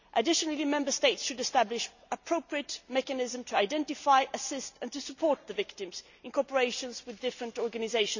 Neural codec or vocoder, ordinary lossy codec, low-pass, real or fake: none; none; 7.2 kHz; real